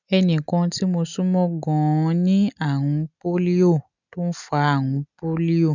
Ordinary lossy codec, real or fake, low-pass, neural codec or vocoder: none; real; 7.2 kHz; none